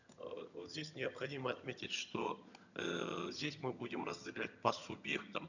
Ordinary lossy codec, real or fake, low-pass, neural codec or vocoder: none; fake; 7.2 kHz; vocoder, 22.05 kHz, 80 mel bands, HiFi-GAN